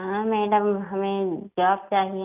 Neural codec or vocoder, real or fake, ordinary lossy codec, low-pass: none; real; none; 3.6 kHz